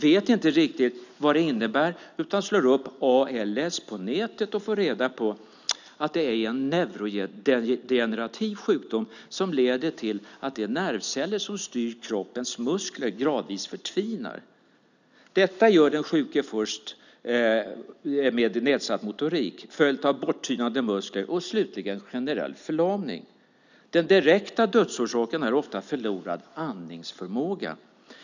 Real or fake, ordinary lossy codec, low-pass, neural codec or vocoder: real; none; 7.2 kHz; none